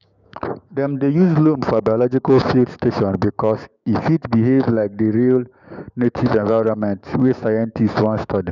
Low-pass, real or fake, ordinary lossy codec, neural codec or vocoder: 7.2 kHz; fake; none; codec, 16 kHz, 6 kbps, DAC